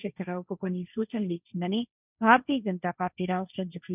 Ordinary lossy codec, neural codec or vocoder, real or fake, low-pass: none; codec, 16 kHz, 1.1 kbps, Voila-Tokenizer; fake; 3.6 kHz